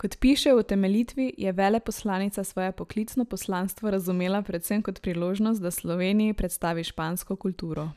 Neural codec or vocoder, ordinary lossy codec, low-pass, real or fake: none; none; 14.4 kHz; real